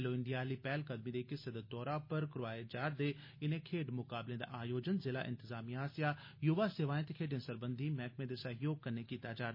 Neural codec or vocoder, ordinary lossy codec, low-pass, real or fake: none; MP3, 24 kbps; 5.4 kHz; real